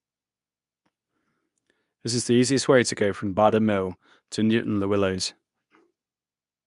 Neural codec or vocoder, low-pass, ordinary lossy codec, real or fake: codec, 24 kHz, 0.9 kbps, WavTokenizer, medium speech release version 2; 10.8 kHz; none; fake